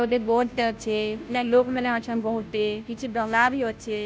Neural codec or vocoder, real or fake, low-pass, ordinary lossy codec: codec, 16 kHz, 0.5 kbps, FunCodec, trained on Chinese and English, 25 frames a second; fake; none; none